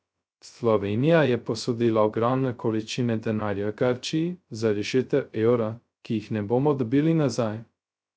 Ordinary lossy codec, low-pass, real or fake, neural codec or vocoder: none; none; fake; codec, 16 kHz, 0.2 kbps, FocalCodec